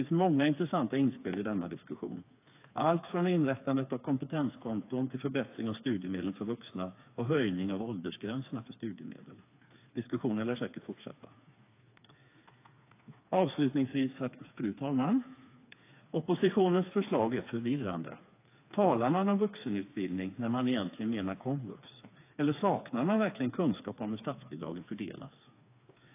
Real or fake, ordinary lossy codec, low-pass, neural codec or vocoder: fake; AAC, 24 kbps; 3.6 kHz; codec, 16 kHz, 4 kbps, FreqCodec, smaller model